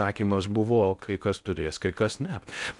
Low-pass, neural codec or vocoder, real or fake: 10.8 kHz; codec, 16 kHz in and 24 kHz out, 0.6 kbps, FocalCodec, streaming, 2048 codes; fake